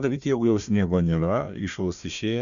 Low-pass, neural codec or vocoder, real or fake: 7.2 kHz; codec, 16 kHz, 1 kbps, FunCodec, trained on Chinese and English, 50 frames a second; fake